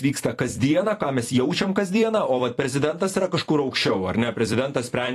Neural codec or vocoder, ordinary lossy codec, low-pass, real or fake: vocoder, 44.1 kHz, 128 mel bands every 256 samples, BigVGAN v2; AAC, 48 kbps; 14.4 kHz; fake